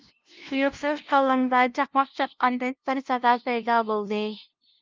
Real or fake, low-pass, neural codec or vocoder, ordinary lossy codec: fake; 7.2 kHz; codec, 16 kHz, 0.5 kbps, FunCodec, trained on LibriTTS, 25 frames a second; Opus, 24 kbps